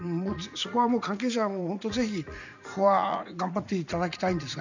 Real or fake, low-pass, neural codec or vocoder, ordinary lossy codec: fake; 7.2 kHz; vocoder, 44.1 kHz, 80 mel bands, Vocos; none